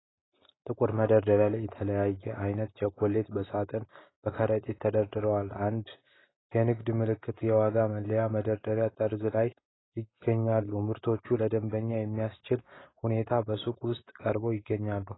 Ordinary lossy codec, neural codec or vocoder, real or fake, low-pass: AAC, 16 kbps; none; real; 7.2 kHz